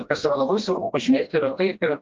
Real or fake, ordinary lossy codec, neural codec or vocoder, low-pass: fake; Opus, 32 kbps; codec, 16 kHz, 1 kbps, FreqCodec, smaller model; 7.2 kHz